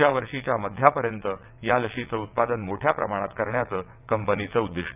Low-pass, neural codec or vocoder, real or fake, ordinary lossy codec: 3.6 kHz; vocoder, 22.05 kHz, 80 mel bands, WaveNeXt; fake; none